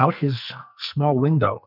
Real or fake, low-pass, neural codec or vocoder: fake; 5.4 kHz; codec, 44.1 kHz, 2.6 kbps, SNAC